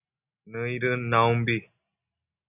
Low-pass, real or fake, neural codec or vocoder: 3.6 kHz; real; none